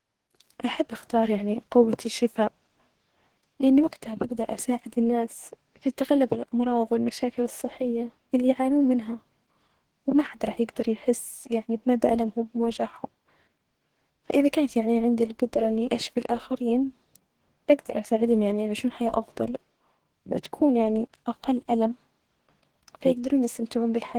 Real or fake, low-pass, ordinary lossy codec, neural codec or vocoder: fake; 19.8 kHz; Opus, 16 kbps; codec, 44.1 kHz, 2.6 kbps, DAC